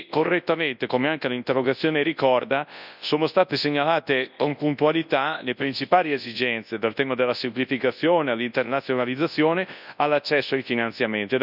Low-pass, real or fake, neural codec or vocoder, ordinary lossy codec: 5.4 kHz; fake; codec, 24 kHz, 0.9 kbps, WavTokenizer, large speech release; none